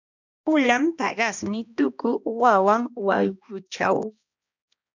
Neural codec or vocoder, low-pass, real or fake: codec, 16 kHz, 1 kbps, X-Codec, HuBERT features, trained on balanced general audio; 7.2 kHz; fake